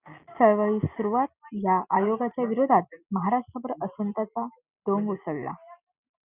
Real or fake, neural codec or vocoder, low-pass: real; none; 3.6 kHz